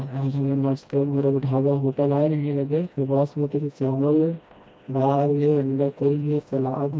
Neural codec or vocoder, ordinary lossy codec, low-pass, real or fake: codec, 16 kHz, 1 kbps, FreqCodec, smaller model; none; none; fake